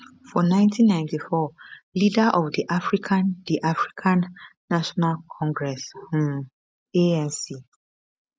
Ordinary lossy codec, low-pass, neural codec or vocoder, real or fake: none; none; none; real